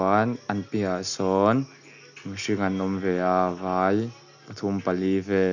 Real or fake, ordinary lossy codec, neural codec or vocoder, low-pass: real; none; none; 7.2 kHz